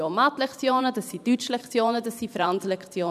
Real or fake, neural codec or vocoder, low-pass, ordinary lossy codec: fake; vocoder, 44.1 kHz, 128 mel bands every 512 samples, BigVGAN v2; 14.4 kHz; none